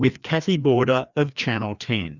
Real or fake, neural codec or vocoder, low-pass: fake; codec, 16 kHz, 2 kbps, FreqCodec, larger model; 7.2 kHz